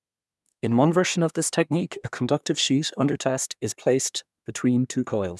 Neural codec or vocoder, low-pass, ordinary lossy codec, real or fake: codec, 24 kHz, 1 kbps, SNAC; none; none; fake